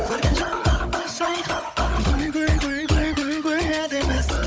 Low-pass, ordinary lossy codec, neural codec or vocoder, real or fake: none; none; codec, 16 kHz, 16 kbps, FunCodec, trained on Chinese and English, 50 frames a second; fake